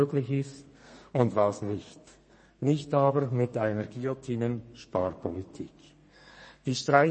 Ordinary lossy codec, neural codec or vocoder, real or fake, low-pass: MP3, 32 kbps; codec, 32 kHz, 1.9 kbps, SNAC; fake; 10.8 kHz